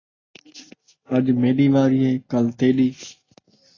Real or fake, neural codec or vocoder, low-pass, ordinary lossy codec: real; none; 7.2 kHz; AAC, 32 kbps